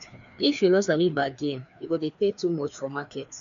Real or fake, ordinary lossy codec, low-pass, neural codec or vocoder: fake; none; 7.2 kHz; codec, 16 kHz, 2 kbps, FreqCodec, larger model